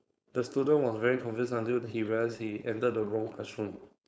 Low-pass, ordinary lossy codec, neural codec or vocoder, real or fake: none; none; codec, 16 kHz, 4.8 kbps, FACodec; fake